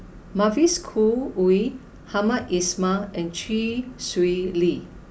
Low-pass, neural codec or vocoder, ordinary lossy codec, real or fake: none; none; none; real